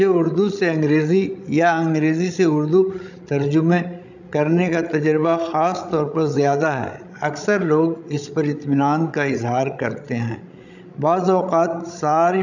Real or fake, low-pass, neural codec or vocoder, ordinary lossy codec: fake; 7.2 kHz; codec, 16 kHz, 16 kbps, FreqCodec, larger model; none